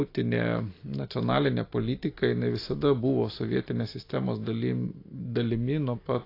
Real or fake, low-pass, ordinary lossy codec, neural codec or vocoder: real; 5.4 kHz; AAC, 32 kbps; none